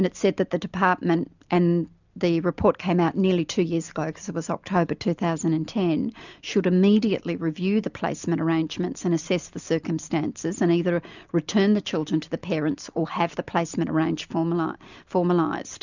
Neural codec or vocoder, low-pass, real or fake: none; 7.2 kHz; real